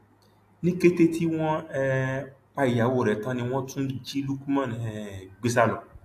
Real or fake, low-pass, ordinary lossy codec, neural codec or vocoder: real; 14.4 kHz; AAC, 64 kbps; none